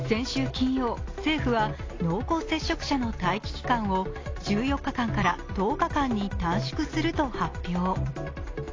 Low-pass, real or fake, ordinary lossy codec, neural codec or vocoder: 7.2 kHz; real; AAC, 32 kbps; none